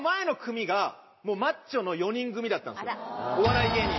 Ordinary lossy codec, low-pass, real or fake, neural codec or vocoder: MP3, 24 kbps; 7.2 kHz; real; none